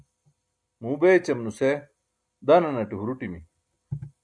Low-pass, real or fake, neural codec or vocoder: 9.9 kHz; real; none